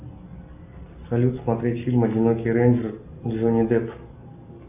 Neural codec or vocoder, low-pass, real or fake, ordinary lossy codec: none; 3.6 kHz; real; AAC, 32 kbps